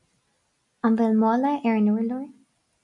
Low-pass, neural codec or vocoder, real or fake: 10.8 kHz; none; real